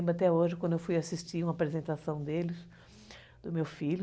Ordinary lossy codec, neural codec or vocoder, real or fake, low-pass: none; none; real; none